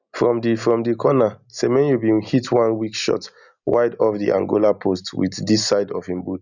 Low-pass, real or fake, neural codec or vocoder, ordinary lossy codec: 7.2 kHz; real; none; none